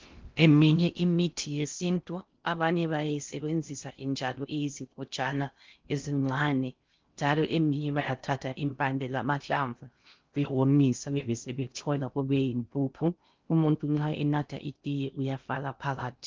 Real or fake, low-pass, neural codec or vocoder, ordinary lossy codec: fake; 7.2 kHz; codec, 16 kHz in and 24 kHz out, 0.6 kbps, FocalCodec, streaming, 4096 codes; Opus, 32 kbps